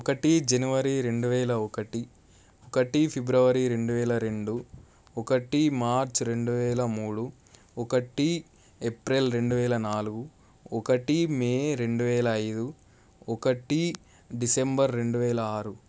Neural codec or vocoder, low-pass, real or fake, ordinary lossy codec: none; none; real; none